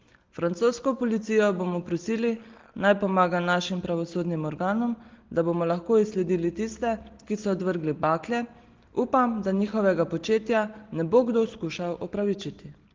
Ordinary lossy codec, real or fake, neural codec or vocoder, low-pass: Opus, 16 kbps; real; none; 7.2 kHz